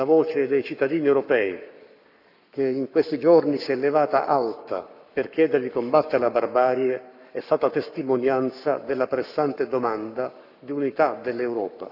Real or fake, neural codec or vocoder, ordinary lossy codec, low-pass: fake; codec, 44.1 kHz, 7.8 kbps, Pupu-Codec; none; 5.4 kHz